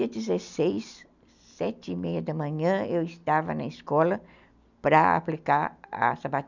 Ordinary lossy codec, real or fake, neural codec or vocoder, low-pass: none; real; none; 7.2 kHz